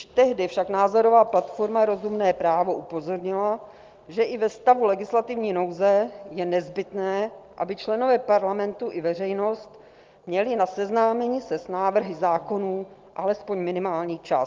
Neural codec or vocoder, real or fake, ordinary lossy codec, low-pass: none; real; Opus, 32 kbps; 7.2 kHz